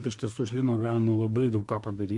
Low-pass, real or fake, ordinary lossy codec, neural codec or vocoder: 10.8 kHz; fake; MP3, 64 kbps; codec, 24 kHz, 1 kbps, SNAC